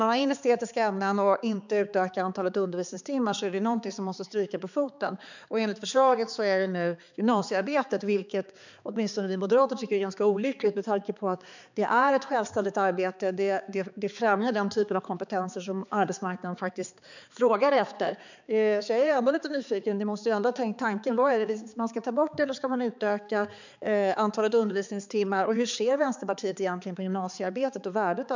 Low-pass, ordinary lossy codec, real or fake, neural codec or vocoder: 7.2 kHz; none; fake; codec, 16 kHz, 4 kbps, X-Codec, HuBERT features, trained on balanced general audio